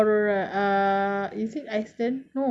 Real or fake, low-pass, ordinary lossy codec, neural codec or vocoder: real; none; none; none